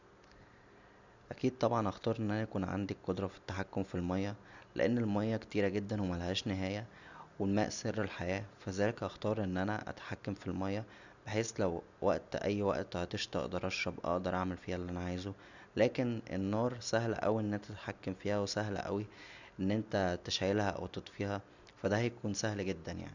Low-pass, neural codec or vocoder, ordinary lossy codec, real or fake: 7.2 kHz; none; none; real